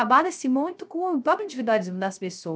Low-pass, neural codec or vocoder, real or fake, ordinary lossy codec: none; codec, 16 kHz, 0.3 kbps, FocalCodec; fake; none